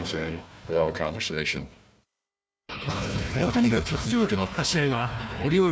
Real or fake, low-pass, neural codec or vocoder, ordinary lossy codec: fake; none; codec, 16 kHz, 1 kbps, FunCodec, trained on Chinese and English, 50 frames a second; none